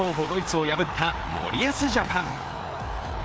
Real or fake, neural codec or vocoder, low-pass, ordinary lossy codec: fake; codec, 16 kHz, 4 kbps, FreqCodec, larger model; none; none